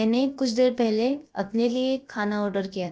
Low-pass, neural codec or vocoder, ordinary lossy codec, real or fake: none; codec, 16 kHz, about 1 kbps, DyCAST, with the encoder's durations; none; fake